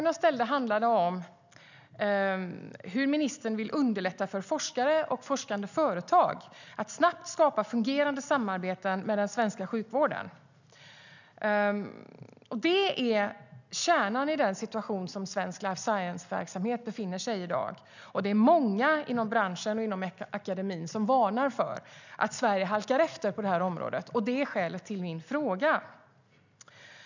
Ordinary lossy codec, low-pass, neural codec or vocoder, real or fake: none; 7.2 kHz; none; real